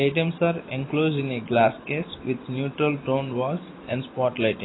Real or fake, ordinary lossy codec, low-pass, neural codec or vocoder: real; AAC, 16 kbps; 7.2 kHz; none